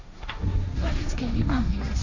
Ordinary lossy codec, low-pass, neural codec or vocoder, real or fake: none; 7.2 kHz; codec, 16 kHz, 1.1 kbps, Voila-Tokenizer; fake